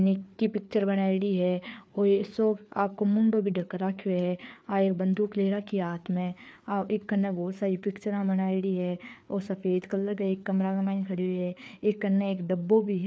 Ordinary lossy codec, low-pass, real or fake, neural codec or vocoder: none; none; fake; codec, 16 kHz, 4 kbps, FreqCodec, larger model